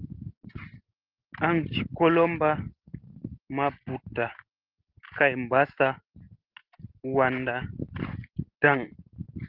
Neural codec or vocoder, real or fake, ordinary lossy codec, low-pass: none; real; Opus, 16 kbps; 5.4 kHz